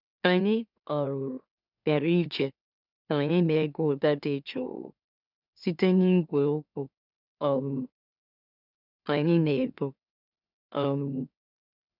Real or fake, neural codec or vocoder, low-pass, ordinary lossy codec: fake; autoencoder, 44.1 kHz, a latent of 192 numbers a frame, MeloTTS; 5.4 kHz; none